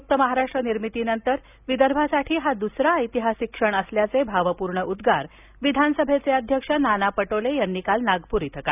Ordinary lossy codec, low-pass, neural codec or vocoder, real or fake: none; 3.6 kHz; none; real